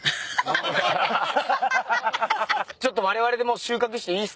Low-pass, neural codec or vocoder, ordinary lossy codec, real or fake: none; none; none; real